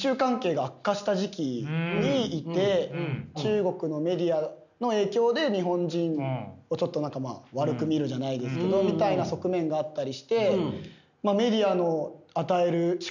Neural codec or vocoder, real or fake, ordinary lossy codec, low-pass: none; real; none; 7.2 kHz